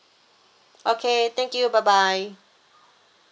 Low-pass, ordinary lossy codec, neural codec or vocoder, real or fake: none; none; none; real